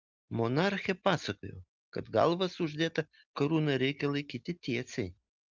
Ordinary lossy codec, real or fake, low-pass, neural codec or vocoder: Opus, 24 kbps; real; 7.2 kHz; none